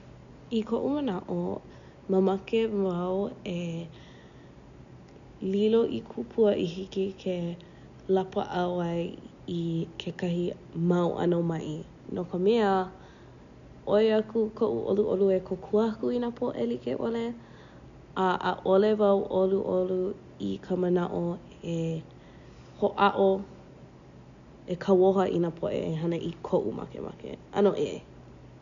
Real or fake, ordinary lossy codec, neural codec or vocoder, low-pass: real; none; none; 7.2 kHz